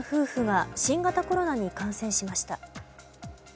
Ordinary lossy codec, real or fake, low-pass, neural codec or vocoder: none; real; none; none